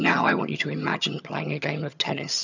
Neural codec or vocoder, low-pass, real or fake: vocoder, 22.05 kHz, 80 mel bands, HiFi-GAN; 7.2 kHz; fake